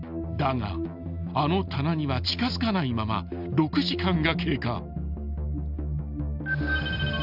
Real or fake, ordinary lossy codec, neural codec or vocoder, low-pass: real; none; none; 5.4 kHz